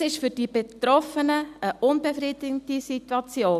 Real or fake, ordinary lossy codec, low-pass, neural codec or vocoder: real; none; 14.4 kHz; none